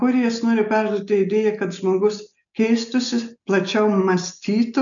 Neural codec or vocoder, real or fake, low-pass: none; real; 7.2 kHz